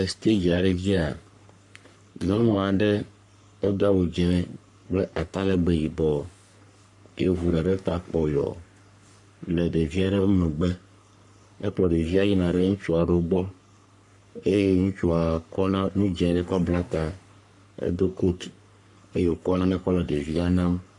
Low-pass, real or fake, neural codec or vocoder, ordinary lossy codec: 10.8 kHz; fake; codec, 44.1 kHz, 3.4 kbps, Pupu-Codec; MP3, 64 kbps